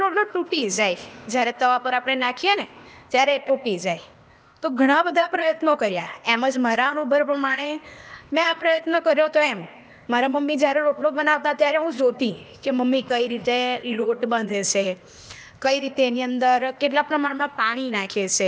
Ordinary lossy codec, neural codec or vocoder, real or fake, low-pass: none; codec, 16 kHz, 0.8 kbps, ZipCodec; fake; none